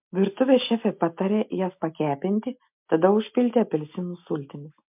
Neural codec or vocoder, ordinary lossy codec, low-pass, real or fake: none; MP3, 32 kbps; 3.6 kHz; real